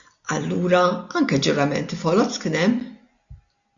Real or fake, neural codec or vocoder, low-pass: real; none; 7.2 kHz